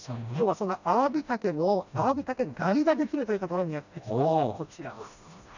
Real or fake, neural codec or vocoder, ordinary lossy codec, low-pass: fake; codec, 16 kHz, 1 kbps, FreqCodec, smaller model; none; 7.2 kHz